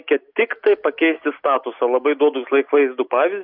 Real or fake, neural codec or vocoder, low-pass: real; none; 5.4 kHz